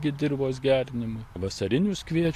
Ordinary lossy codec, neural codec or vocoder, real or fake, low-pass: AAC, 64 kbps; none; real; 14.4 kHz